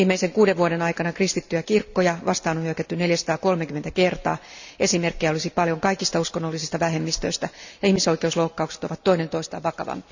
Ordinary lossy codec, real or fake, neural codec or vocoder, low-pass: none; real; none; 7.2 kHz